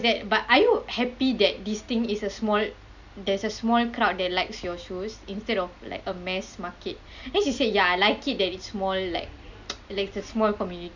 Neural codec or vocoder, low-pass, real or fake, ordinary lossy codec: none; 7.2 kHz; real; none